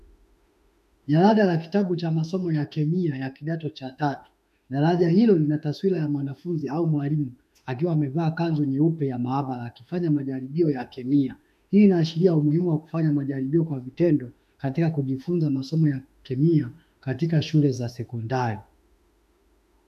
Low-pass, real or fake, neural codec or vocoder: 14.4 kHz; fake; autoencoder, 48 kHz, 32 numbers a frame, DAC-VAE, trained on Japanese speech